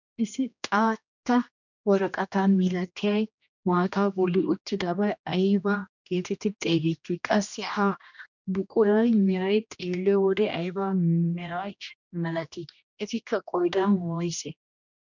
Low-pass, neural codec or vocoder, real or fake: 7.2 kHz; codec, 16 kHz, 1 kbps, X-Codec, HuBERT features, trained on general audio; fake